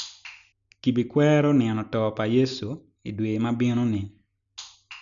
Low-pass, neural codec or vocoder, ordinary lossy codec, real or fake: 7.2 kHz; none; none; real